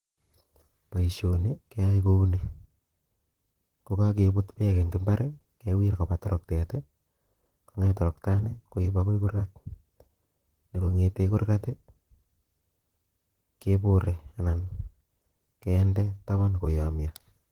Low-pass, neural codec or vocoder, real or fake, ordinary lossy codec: 19.8 kHz; vocoder, 44.1 kHz, 128 mel bands, Pupu-Vocoder; fake; Opus, 16 kbps